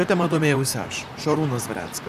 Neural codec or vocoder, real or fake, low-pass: vocoder, 44.1 kHz, 128 mel bands, Pupu-Vocoder; fake; 14.4 kHz